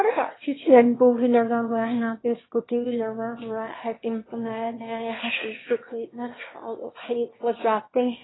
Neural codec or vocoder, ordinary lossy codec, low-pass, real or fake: autoencoder, 22.05 kHz, a latent of 192 numbers a frame, VITS, trained on one speaker; AAC, 16 kbps; 7.2 kHz; fake